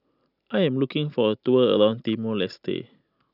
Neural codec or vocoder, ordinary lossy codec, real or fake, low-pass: none; none; real; 5.4 kHz